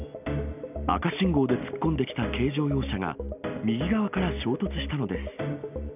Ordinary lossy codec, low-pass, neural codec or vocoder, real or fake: none; 3.6 kHz; none; real